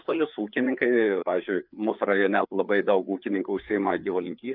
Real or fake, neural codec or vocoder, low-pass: fake; codec, 16 kHz, 4 kbps, FreqCodec, larger model; 5.4 kHz